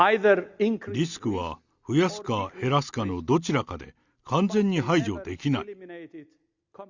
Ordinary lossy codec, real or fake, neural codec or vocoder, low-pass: Opus, 64 kbps; real; none; 7.2 kHz